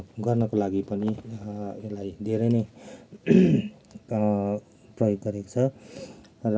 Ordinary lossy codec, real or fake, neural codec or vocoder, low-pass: none; real; none; none